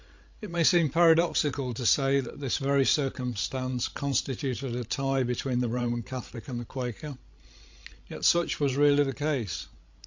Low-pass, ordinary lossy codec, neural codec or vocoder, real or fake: 7.2 kHz; MP3, 48 kbps; codec, 16 kHz, 16 kbps, FreqCodec, larger model; fake